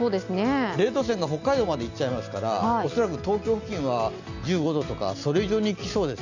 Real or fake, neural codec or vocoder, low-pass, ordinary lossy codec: real; none; 7.2 kHz; none